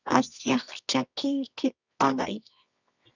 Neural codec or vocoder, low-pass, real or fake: codec, 24 kHz, 0.9 kbps, WavTokenizer, medium music audio release; 7.2 kHz; fake